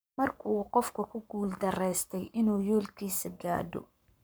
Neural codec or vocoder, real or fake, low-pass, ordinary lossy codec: codec, 44.1 kHz, 7.8 kbps, Pupu-Codec; fake; none; none